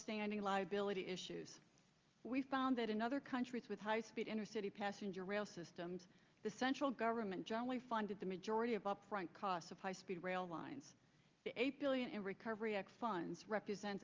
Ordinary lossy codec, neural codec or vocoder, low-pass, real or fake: Opus, 32 kbps; none; 7.2 kHz; real